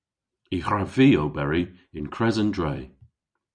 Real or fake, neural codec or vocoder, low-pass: fake; vocoder, 44.1 kHz, 128 mel bands every 256 samples, BigVGAN v2; 9.9 kHz